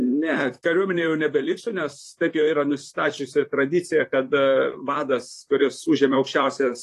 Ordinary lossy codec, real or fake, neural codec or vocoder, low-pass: AAC, 48 kbps; fake; vocoder, 44.1 kHz, 128 mel bands, Pupu-Vocoder; 14.4 kHz